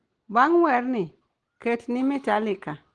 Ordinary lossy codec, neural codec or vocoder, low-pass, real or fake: Opus, 16 kbps; none; 9.9 kHz; real